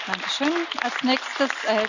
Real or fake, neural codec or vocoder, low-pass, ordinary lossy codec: real; none; 7.2 kHz; none